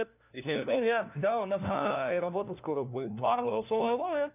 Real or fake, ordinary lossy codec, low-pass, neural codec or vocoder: fake; none; 3.6 kHz; codec, 16 kHz, 1 kbps, FunCodec, trained on LibriTTS, 50 frames a second